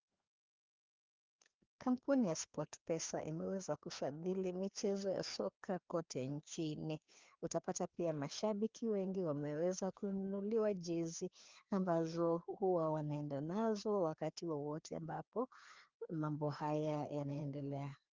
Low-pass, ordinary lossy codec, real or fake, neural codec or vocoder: 7.2 kHz; Opus, 32 kbps; fake; codec, 16 kHz, 2 kbps, FreqCodec, larger model